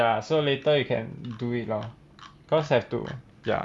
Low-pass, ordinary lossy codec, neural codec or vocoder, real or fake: none; none; none; real